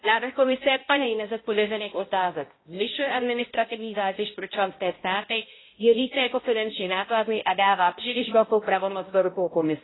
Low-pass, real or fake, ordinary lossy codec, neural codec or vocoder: 7.2 kHz; fake; AAC, 16 kbps; codec, 16 kHz, 0.5 kbps, X-Codec, HuBERT features, trained on balanced general audio